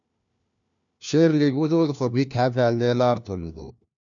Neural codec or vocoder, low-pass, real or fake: codec, 16 kHz, 1 kbps, FunCodec, trained on LibriTTS, 50 frames a second; 7.2 kHz; fake